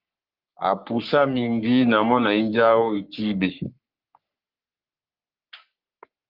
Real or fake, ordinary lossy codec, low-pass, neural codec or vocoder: fake; Opus, 24 kbps; 5.4 kHz; codec, 44.1 kHz, 3.4 kbps, Pupu-Codec